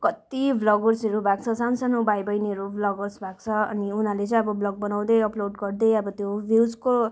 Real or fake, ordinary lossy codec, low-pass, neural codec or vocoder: real; none; none; none